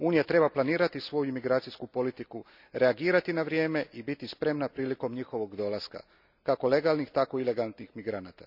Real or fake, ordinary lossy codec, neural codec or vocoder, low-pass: real; none; none; 5.4 kHz